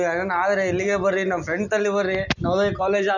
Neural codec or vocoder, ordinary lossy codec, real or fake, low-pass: none; none; real; 7.2 kHz